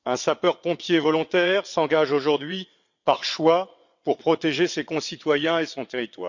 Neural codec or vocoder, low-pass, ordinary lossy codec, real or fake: vocoder, 22.05 kHz, 80 mel bands, WaveNeXt; 7.2 kHz; none; fake